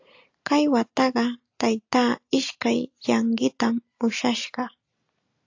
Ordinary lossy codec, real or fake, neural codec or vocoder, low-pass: AAC, 48 kbps; real; none; 7.2 kHz